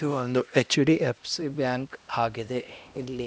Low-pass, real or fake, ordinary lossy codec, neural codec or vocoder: none; fake; none; codec, 16 kHz, 1 kbps, X-Codec, HuBERT features, trained on LibriSpeech